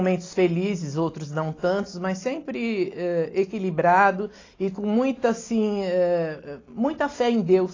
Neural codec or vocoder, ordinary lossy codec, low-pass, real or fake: none; AAC, 32 kbps; 7.2 kHz; real